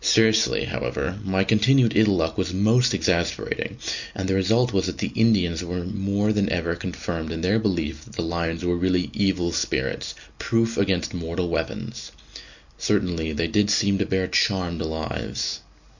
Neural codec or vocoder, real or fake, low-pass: none; real; 7.2 kHz